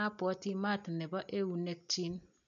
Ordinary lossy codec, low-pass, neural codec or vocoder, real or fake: none; 7.2 kHz; none; real